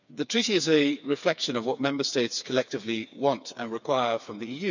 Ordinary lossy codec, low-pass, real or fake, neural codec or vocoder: none; 7.2 kHz; fake; codec, 16 kHz, 4 kbps, FreqCodec, smaller model